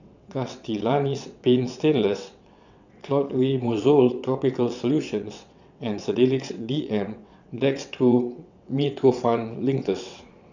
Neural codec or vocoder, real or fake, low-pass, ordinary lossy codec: vocoder, 22.05 kHz, 80 mel bands, WaveNeXt; fake; 7.2 kHz; none